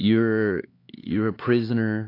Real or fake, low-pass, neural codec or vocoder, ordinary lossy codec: fake; 5.4 kHz; codec, 16 kHz, 4 kbps, X-Codec, HuBERT features, trained on LibriSpeech; AAC, 32 kbps